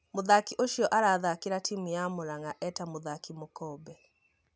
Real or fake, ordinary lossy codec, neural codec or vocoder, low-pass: real; none; none; none